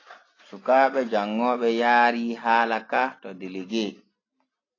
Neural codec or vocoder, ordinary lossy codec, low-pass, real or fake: none; AAC, 32 kbps; 7.2 kHz; real